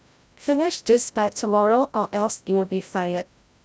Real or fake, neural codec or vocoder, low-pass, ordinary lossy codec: fake; codec, 16 kHz, 0.5 kbps, FreqCodec, larger model; none; none